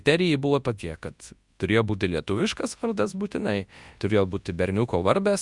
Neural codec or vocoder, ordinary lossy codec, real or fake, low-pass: codec, 24 kHz, 0.9 kbps, WavTokenizer, large speech release; Opus, 64 kbps; fake; 10.8 kHz